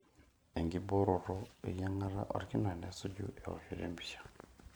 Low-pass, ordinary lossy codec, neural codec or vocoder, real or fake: none; none; vocoder, 44.1 kHz, 128 mel bands every 512 samples, BigVGAN v2; fake